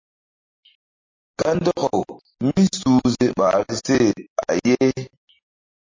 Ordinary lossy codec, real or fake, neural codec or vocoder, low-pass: MP3, 32 kbps; real; none; 7.2 kHz